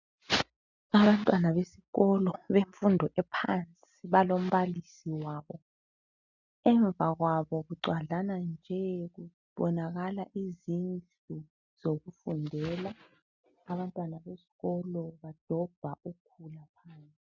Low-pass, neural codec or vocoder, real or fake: 7.2 kHz; none; real